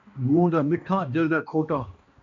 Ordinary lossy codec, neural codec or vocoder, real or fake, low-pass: MP3, 48 kbps; codec, 16 kHz, 1 kbps, X-Codec, HuBERT features, trained on balanced general audio; fake; 7.2 kHz